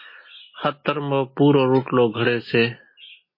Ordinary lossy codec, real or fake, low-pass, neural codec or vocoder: MP3, 24 kbps; real; 5.4 kHz; none